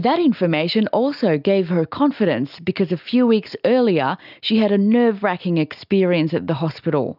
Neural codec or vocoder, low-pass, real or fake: none; 5.4 kHz; real